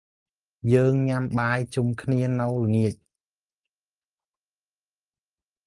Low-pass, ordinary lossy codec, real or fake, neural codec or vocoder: 10.8 kHz; Opus, 32 kbps; real; none